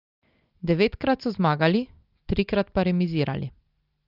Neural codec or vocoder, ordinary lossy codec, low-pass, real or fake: none; Opus, 24 kbps; 5.4 kHz; real